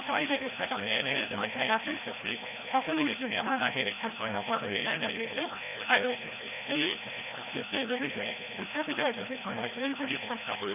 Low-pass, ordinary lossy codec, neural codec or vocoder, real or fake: 3.6 kHz; none; codec, 16 kHz, 1 kbps, FreqCodec, larger model; fake